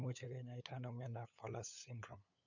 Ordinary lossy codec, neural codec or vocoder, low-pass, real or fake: none; codec, 16 kHz, 8 kbps, FunCodec, trained on LibriTTS, 25 frames a second; 7.2 kHz; fake